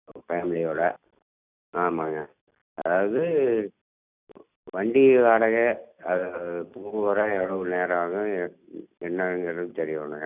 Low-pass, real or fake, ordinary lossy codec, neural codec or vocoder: 3.6 kHz; real; none; none